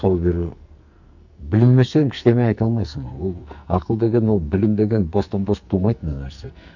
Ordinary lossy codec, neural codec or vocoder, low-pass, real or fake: none; codec, 44.1 kHz, 2.6 kbps, SNAC; 7.2 kHz; fake